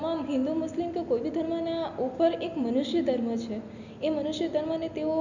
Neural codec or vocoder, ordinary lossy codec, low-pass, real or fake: none; none; 7.2 kHz; real